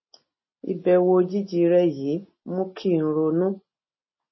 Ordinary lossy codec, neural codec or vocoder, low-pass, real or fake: MP3, 24 kbps; none; 7.2 kHz; real